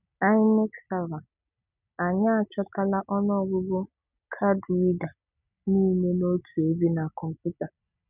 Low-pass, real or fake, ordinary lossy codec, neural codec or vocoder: 3.6 kHz; real; none; none